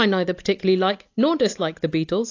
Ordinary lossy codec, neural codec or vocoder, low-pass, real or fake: AAC, 48 kbps; none; 7.2 kHz; real